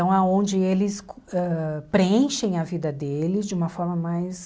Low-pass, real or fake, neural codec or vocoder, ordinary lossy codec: none; real; none; none